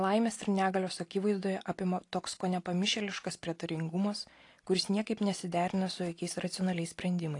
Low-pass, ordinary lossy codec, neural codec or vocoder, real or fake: 10.8 kHz; AAC, 48 kbps; none; real